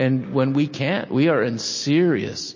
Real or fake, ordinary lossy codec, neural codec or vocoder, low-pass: real; MP3, 32 kbps; none; 7.2 kHz